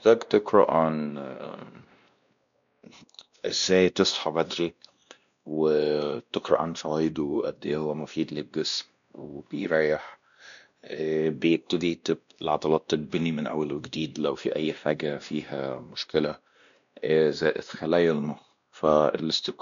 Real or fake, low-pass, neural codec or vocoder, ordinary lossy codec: fake; 7.2 kHz; codec, 16 kHz, 1 kbps, X-Codec, WavLM features, trained on Multilingual LibriSpeech; none